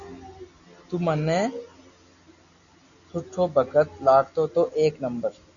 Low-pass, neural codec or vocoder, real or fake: 7.2 kHz; none; real